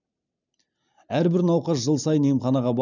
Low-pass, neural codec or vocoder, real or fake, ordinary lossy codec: 7.2 kHz; none; real; none